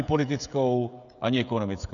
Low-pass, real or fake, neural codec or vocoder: 7.2 kHz; fake; codec, 16 kHz, 16 kbps, FreqCodec, smaller model